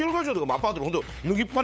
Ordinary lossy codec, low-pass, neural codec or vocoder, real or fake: none; none; codec, 16 kHz, 8 kbps, FunCodec, trained on LibriTTS, 25 frames a second; fake